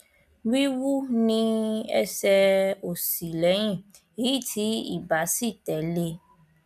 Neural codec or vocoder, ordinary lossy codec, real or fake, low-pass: none; none; real; 14.4 kHz